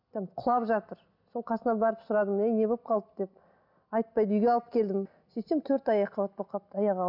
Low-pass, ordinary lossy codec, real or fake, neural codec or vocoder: 5.4 kHz; none; real; none